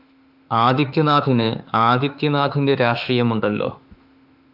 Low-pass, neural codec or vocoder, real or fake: 5.4 kHz; autoencoder, 48 kHz, 32 numbers a frame, DAC-VAE, trained on Japanese speech; fake